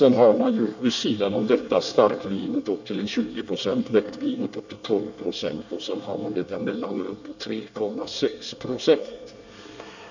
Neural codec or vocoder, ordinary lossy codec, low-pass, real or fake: codec, 24 kHz, 1 kbps, SNAC; none; 7.2 kHz; fake